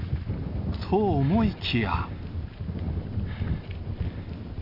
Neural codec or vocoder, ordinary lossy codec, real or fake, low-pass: none; none; real; 5.4 kHz